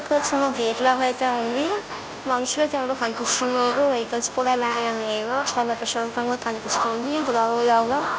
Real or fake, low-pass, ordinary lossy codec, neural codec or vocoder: fake; none; none; codec, 16 kHz, 0.5 kbps, FunCodec, trained on Chinese and English, 25 frames a second